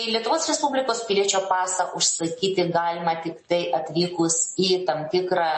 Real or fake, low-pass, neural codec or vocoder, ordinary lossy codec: real; 10.8 kHz; none; MP3, 32 kbps